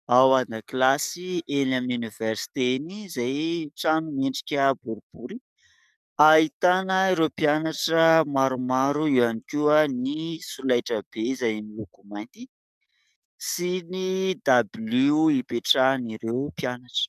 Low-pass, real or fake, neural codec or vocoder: 14.4 kHz; fake; codec, 44.1 kHz, 7.8 kbps, DAC